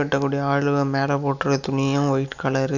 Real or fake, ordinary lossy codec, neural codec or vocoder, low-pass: real; none; none; 7.2 kHz